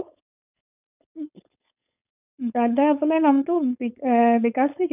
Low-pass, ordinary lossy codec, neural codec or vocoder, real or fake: 3.6 kHz; none; codec, 16 kHz, 4.8 kbps, FACodec; fake